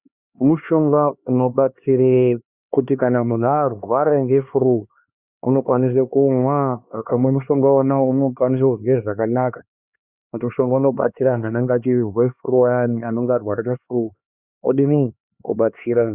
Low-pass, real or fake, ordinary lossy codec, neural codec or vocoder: 3.6 kHz; fake; Opus, 64 kbps; codec, 16 kHz, 2 kbps, X-Codec, HuBERT features, trained on LibriSpeech